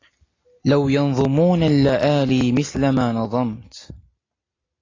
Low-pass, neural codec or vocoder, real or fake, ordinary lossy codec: 7.2 kHz; none; real; AAC, 32 kbps